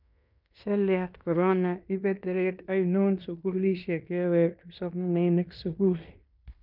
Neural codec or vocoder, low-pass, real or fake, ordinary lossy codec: codec, 16 kHz in and 24 kHz out, 0.9 kbps, LongCat-Audio-Codec, fine tuned four codebook decoder; 5.4 kHz; fake; none